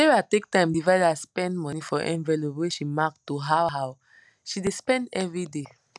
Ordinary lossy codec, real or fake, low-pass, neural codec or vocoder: none; real; none; none